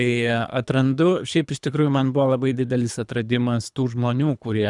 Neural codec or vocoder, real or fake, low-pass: codec, 24 kHz, 3 kbps, HILCodec; fake; 10.8 kHz